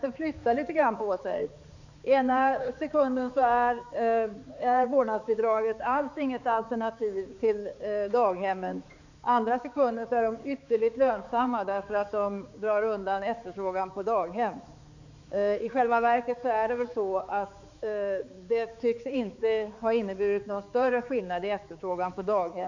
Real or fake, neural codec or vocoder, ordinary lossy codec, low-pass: fake; codec, 16 kHz, 4 kbps, X-Codec, HuBERT features, trained on balanced general audio; none; 7.2 kHz